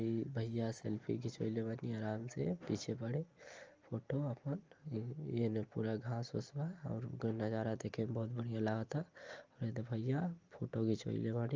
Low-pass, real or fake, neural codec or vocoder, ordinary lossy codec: 7.2 kHz; real; none; Opus, 24 kbps